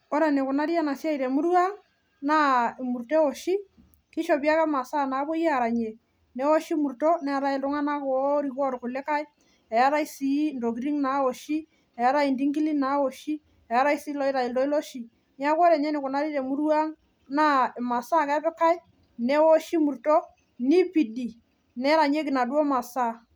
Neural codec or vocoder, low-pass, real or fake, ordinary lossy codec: none; none; real; none